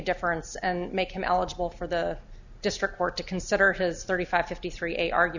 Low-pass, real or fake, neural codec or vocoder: 7.2 kHz; real; none